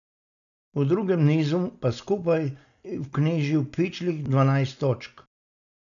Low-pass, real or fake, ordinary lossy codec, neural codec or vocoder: 7.2 kHz; real; none; none